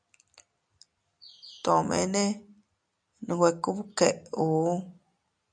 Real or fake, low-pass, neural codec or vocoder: real; 9.9 kHz; none